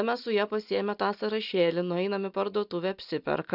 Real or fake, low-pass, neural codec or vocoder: real; 5.4 kHz; none